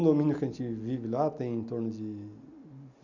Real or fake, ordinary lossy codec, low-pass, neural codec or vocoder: real; none; 7.2 kHz; none